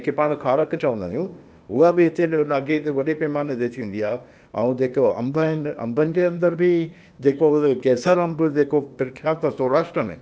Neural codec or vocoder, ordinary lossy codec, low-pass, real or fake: codec, 16 kHz, 0.8 kbps, ZipCodec; none; none; fake